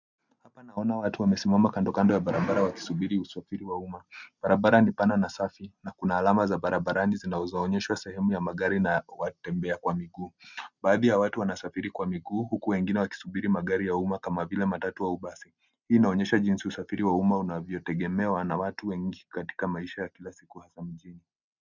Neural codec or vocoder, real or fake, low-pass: none; real; 7.2 kHz